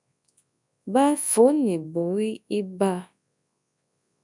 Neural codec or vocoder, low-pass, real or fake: codec, 24 kHz, 0.9 kbps, WavTokenizer, large speech release; 10.8 kHz; fake